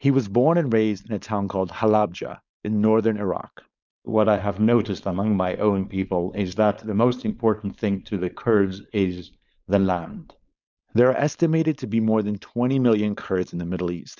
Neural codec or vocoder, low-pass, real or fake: codec, 16 kHz, 4.8 kbps, FACodec; 7.2 kHz; fake